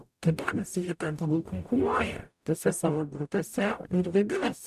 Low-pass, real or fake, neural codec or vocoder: 14.4 kHz; fake; codec, 44.1 kHz, 0.9 kbps, DAC